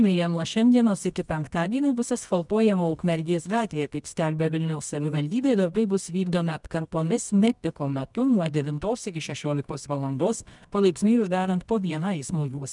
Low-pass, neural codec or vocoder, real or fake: 10.8 kHz; codec, 24 kHz, 0.9 kbps, WavTokenizer, medium music audio release; fake